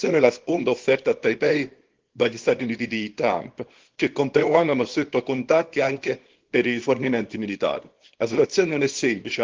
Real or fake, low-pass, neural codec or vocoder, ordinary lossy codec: fake; 7.2 kHz; codec, 24 kHz, 0.9 kbps, WavTokenizer, small release; Opus, 16 kbps